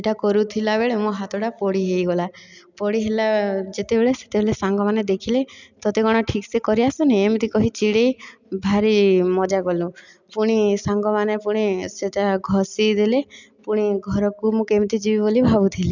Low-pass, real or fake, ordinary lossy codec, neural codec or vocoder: 7.2 kHz; real; none; none